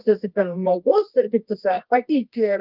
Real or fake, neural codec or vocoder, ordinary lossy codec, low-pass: fake; codec, 24 kHz, 0.9 kbps, WavTokenizer, medium music audio release; Opus, 32 kbps; 5.4 kHz